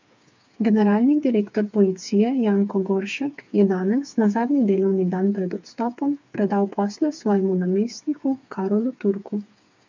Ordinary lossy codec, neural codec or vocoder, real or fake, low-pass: MP3, 48 kbps; codec, 16 kHz, 4 kbps, FreqCodec, smaller model; fake; 7.2 kHz